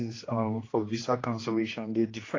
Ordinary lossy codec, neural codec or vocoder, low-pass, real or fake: AAC, 32 kbps; codec, 16 kHz, 1 kbps, X-Codec, HuBERT features, trained on general audio; 7.2 kHz; fake